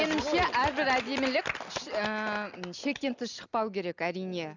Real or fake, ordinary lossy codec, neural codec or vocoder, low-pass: real; none; none; 7.2 kHz